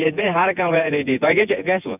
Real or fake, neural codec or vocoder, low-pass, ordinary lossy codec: fake; vocoder, 24 kHz, 100 mel bands, Vocos; 3.6 kHz; none